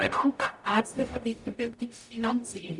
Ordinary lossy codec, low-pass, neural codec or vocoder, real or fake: none; 10.8 kHz; codec, 44.1 kHz, 0.9 kbps, DAC; fake